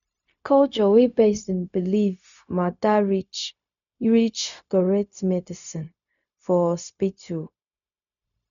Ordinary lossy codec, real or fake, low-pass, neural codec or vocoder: none; fake; 7.2 kHz; codec, 16 kHz, 0.4 kbps, LongCat-Audio-Codec